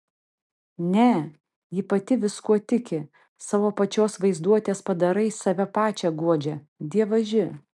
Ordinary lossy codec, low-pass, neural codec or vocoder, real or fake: MP3, 96 kbps; 10.8 kHz; none; real